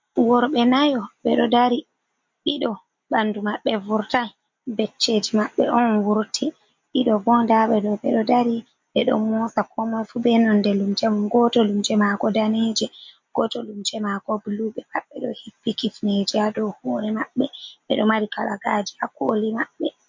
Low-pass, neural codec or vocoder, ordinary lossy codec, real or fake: 7.2 kHz; none; MP3, 48 kbps; real